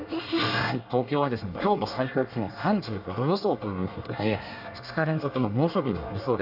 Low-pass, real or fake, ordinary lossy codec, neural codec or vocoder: 5.4 kHz; fake; none; codec, 24 kHz, 1 kbps, SNAC